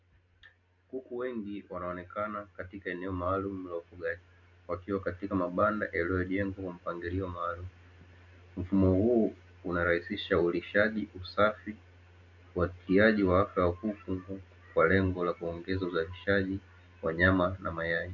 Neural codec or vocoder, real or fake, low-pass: none; real; 7.2 kHz